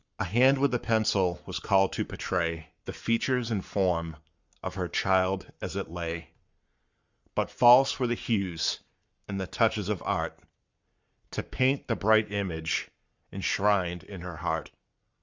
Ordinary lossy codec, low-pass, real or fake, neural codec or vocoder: Opus, 64 kbps; 7.2 kHz; fake; codec, 44.1 kHz, 7.8 kbps, Pupu-Codec